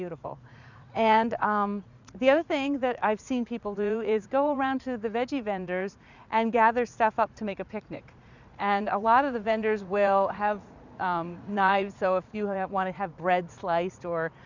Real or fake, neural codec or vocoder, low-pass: fake; vocoder, 44.1 kHz, 80 mel bands, Vocos; 7.2 kHz